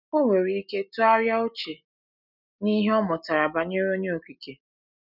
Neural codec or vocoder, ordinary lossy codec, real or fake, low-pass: none; none; real; 5.4 kHz